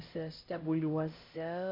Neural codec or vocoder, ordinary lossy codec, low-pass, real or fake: codec, 16 kHz, 0.5 kbps, X-Codec, HuBERT features, trained on LibriSpeech; MP3, 32 kbps; 5.4 kHz; fake